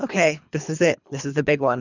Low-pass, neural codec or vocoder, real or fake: 7.2 kHz; codec, 24 kHz, 3 kbps, HILCodec; fake